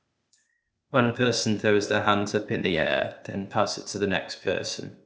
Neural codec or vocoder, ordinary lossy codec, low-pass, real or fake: codec, 16 kHz, 0.8 kbps, ZipCodec; none; none; fake